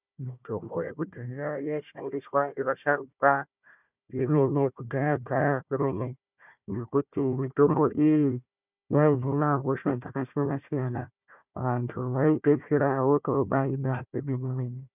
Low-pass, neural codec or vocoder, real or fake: 3.6 kHz; codec, 16 kHz, 1 kbps, FunCodec, trained on Chinese and English, 50 frames a second; fake